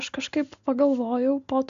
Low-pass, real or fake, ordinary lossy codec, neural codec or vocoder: 7.2 kHz; real; AAC, 64 kbps; none